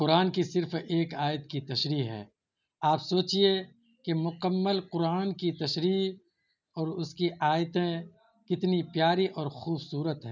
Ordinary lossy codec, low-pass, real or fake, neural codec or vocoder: none; 7.2 kHz; real; none